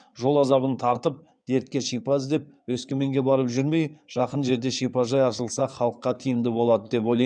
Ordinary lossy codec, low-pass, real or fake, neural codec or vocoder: none; 9.9 kHz; fake; codec, 16 kHz in and 24 kHz out, 2.2 kbps, FireRedTTS-2 codec